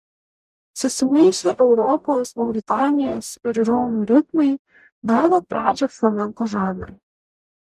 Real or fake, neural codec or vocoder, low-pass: fake; codec, 44.1 kHz, 0.9 kbps, DAC; 14.4 kHz